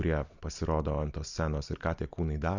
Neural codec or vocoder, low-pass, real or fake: none; 7.2 kHz; real